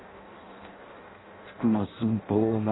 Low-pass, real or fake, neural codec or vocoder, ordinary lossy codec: 7.2 kHz; fake; codec, 16 kHz in and 24 kHz out, 0.6 kbps, FireRedTTS-2 codec; AAC, 16 kbps